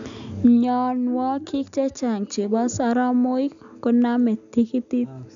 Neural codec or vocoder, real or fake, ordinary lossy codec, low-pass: none; real; none; 7.2 kHz